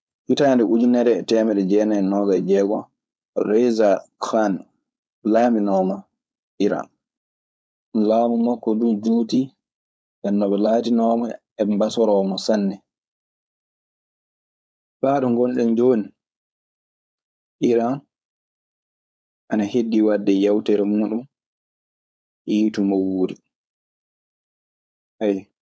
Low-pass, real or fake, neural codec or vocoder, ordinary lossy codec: none; fake; codec, 16 kHz, 4.8 kbps, FACodec; none